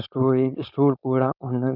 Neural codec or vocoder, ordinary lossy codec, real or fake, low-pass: codec, 16 kHz, 4.8 kbps, FACodec; none; fake; 5.4 kHz